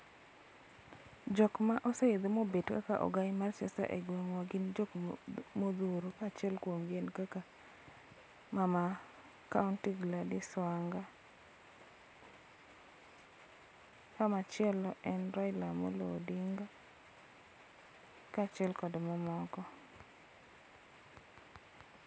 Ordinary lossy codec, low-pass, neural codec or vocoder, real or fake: none; none; none; real